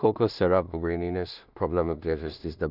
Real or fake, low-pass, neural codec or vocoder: fake; 5.4 kHz; codec, 16 kHz in and 24 kHz out, 0.4 kbps, LongCat-Audio-Codec, two codebook decoder